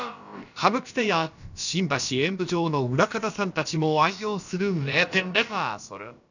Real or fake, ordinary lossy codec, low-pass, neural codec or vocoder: fake; none; 7.2 kHz; codec, 16 kHz, about 1 kbps, DyCAST, with the encoder's durations